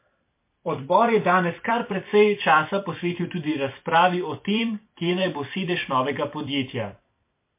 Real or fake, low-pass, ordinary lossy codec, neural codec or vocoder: fake; 3.6 kHz; MP3, 24 kbps; vocoder, 44.1 kHz, 128 mel bands every 256 samples, BigVGAN v2